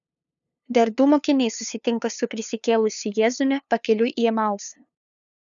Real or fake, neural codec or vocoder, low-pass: fake; codec, 16 kHz, 2 kbps, FunCodec, trained on LibriTTS, 25 frames a second; 7.2 kHz